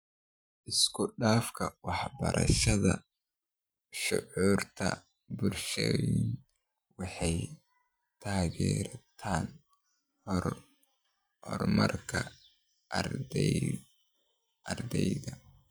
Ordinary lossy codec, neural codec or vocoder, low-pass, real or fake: none; vocoder, 44.1 kHz, 128 mel bands every 512 samples, BigVGAN v2; none; fake